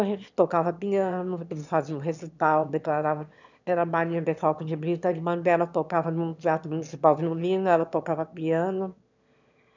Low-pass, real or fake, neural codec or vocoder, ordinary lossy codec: 7.2 kHz; fake; autoencoder, 22.05 kHz, a latent of 192 numbers a frame, VITS, trained on one speaker; none